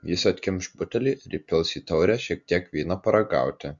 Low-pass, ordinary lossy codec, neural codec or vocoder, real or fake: 7.2 kHz; MP3, 64 kbps; none; real